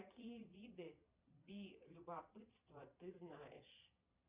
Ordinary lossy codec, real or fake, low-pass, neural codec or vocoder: Opus, 64 kbps; fake; 3.6 kHz; vocoder, 22.05 kHz, 80 mel bands, Vocos